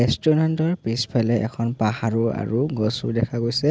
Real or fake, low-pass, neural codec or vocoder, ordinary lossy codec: real; none; none; none